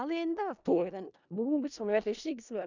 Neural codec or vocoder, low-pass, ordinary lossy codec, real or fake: codec, 16 kHz in and 24 kHz out, 0.4 kbps, LongCat-Audio-Codec, four codebook decoder; 7.2 kHz; none; fake